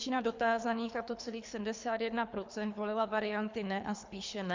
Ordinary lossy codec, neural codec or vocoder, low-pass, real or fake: MP3, 64 kbps; codec, 24 kHz, 3 kbps, HILCodec; 7.2 kHz; fake